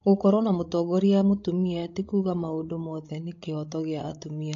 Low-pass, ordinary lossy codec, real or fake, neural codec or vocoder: 7.2 kHz; AAC, 48 kbps; fake; codec, 16 kHz, 16 kbps, FreqCodec, larger model